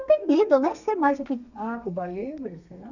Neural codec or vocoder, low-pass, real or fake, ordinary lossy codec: codec, 32 kHz, 1.9 kbps, SNAC; 7.2 kHz; fake; none